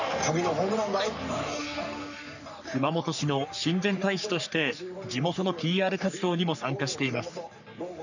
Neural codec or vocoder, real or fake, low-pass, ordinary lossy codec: codec, 44.1 kHz, 3.4 kbps, Pupu-Codec; fake; 7.2 kHz; none